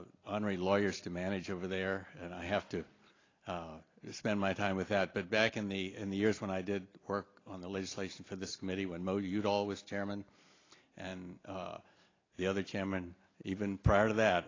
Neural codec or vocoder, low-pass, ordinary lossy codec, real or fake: none; 7.2 kHz; AAC, 32 kbps; real